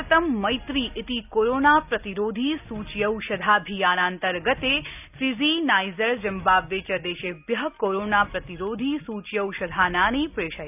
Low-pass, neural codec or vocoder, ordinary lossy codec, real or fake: 3.6 kHz; none; none; real